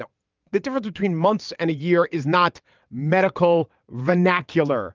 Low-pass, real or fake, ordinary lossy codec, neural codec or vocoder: 7.2 kHz; fake; Opus, 32 kbps; vocoder, 22.05 kHz, 80 mel bands, WaveNeXt